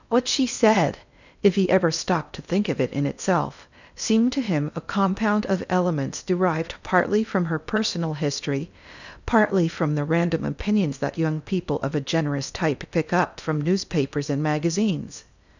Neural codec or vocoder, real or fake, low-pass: codec, 16 kHz in and 24 kHz out, 0.6 kbps, FocalCodec, streaming, 4096 codes; fake; 7.2 kHz